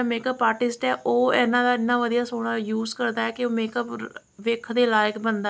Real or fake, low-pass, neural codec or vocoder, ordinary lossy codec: real; none; none; none